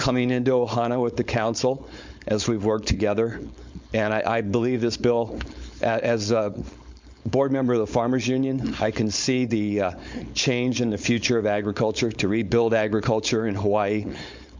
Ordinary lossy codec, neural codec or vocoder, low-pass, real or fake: MP3, 64 kbps; codec, 16 kHz, 4.8 kbps, FACodec; 7.2 kHz; fake